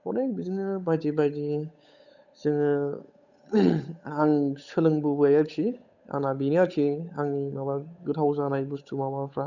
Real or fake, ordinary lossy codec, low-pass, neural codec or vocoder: fake; none; 7.2 kHz; codec, 16 kHz, 8 kbps, FunCodec, trained on Chinese and English, 25 frames a second